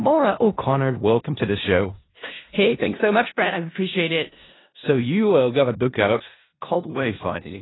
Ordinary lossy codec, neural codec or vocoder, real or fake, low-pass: AAC, 16 kbps; codec, 16 kHz in and 24 kHz out, 0.4 kbps, LongCat-Audio-Codec, four codebook decoder; fake; 7.2 kHz